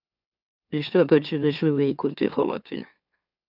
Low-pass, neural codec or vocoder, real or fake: 5.4 kHz; autoencoder, 44.1 kHz, a latent of 192 numbers a frame, MeloTTS; fake